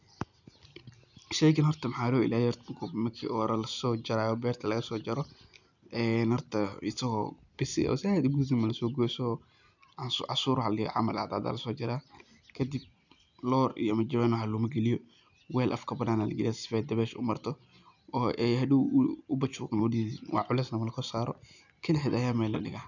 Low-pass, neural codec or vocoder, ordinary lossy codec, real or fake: 7.2 kHz; none; none; real